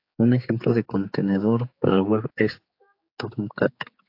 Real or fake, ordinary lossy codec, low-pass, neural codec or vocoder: fake; AAC, 32 kbps; 5.4 kHz; codec, 16 kHz, 4 kbps, X-Codec, HuBERT features, trained on general audio